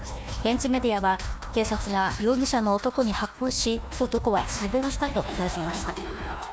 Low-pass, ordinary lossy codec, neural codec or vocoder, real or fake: none; none; codec, 16 kHz, 1 kbps, FunCodec, trained on Chinese and English, 50 frames a second; fake